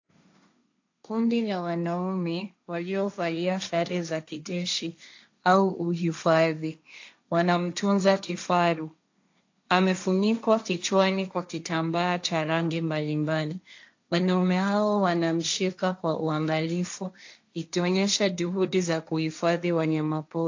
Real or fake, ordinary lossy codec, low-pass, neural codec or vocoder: fake; AAC, 48 kbps; 7.2 kHz; codec, 16 kHz, 1.1 kbps, Voila-Tokenizer